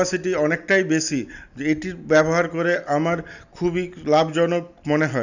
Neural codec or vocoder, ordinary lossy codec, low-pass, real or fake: none; none; 7.2 kHz; real